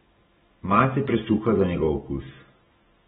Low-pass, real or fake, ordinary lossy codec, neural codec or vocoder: 19.8 kHz; real; AAC, 16 kbps; none